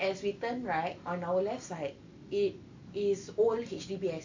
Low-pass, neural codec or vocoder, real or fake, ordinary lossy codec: 7.2 kHz; none; real; AAC, 48 kbps